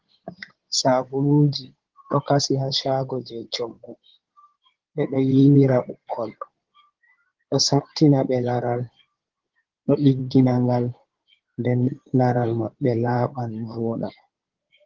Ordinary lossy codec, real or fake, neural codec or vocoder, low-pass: Opus, 16 kbps; fake; codec, 16 kHz in and 24 kHz out, 2.2 kbps, FireRedTTS-2 codec; 7.2 kHz